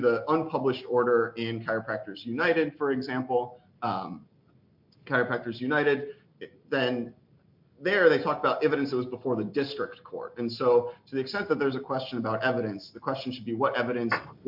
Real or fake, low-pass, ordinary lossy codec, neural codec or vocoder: real; 5.4 kHz; MP3, 48 kbps; none